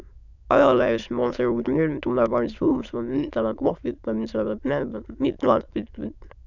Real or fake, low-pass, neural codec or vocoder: fake; 7.2 kHz; autoencoder, 22.05 kHz, a latent of 192 numbers a frame, VITS, trained on many speakers